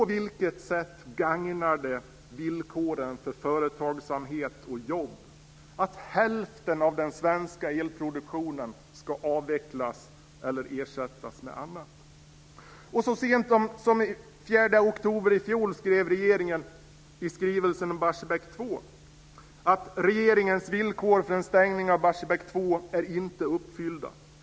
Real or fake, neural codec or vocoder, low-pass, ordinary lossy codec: real; none; none; none